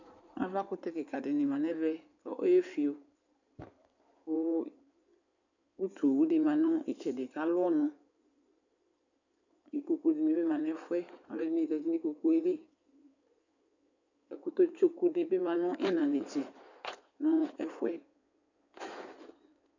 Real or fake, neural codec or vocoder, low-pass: fake; codec, 16 kHz in and 24 kHz out, 2.2 kbps, FireRedTTS-2 codec; 7.2 kHz